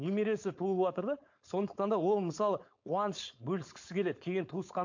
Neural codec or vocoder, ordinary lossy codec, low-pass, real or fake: codec, 16 kHz, 4.8 kbps, FACodec; MP3, 48 kbps; 7.2 kHz; fake